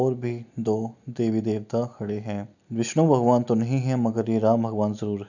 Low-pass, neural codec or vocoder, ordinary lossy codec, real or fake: 7.2 kHz; none; none; real